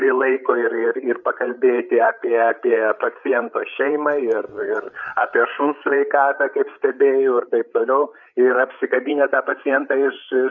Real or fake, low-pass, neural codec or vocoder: fake; 7.2 kHz; codec, 16 kHz, 8 kbps, FreqCodec, larger model